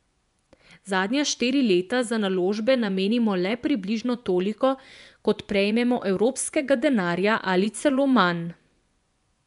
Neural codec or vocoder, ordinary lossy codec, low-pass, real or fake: vocoder, 24 kHz, 100 mel bands, Vocos; none; 10.8 kHz; fake